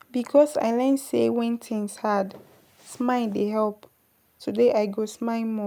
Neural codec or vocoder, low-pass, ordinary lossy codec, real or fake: none; none; none; real